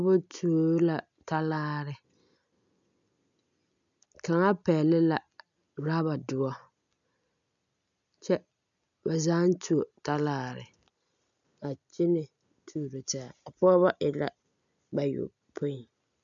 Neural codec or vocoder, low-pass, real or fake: none; 7.2 kHz; real